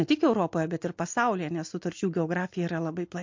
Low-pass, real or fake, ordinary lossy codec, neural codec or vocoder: 7.2 kHz; real; MP3, 48 kbps; none